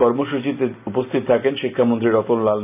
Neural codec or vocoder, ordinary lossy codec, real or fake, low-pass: none; none; real; 3.6 kHz